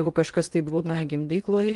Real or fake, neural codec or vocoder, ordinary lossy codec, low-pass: fake; codec, 16 kHz in and 24 kHz out, 0.8 kbps, FocalCodec, streaming, 65536 codes; Opus, 24 kbps; 10.8 kHz